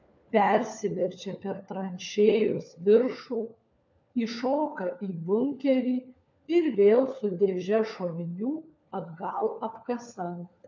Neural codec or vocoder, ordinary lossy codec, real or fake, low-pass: codec, 16 kHz, 4 kbps, FunCodec, trained on LibriTTS, 50 frames a second; MP3, 64 kbps; fake; 7.2 kHz